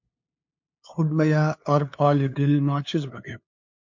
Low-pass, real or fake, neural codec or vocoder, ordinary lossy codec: 7.2 kHz; fake; codec, 16 kHz, 2 kbps, FunCodec, trained on LibriTTS, 25 frames a second; MP3, 48 kbps